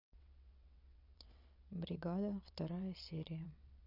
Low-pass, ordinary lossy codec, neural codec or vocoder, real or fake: 5.4 kHz; none; vocoder, 22.05 kHz, 80 mel bands, WaveNeXt; fake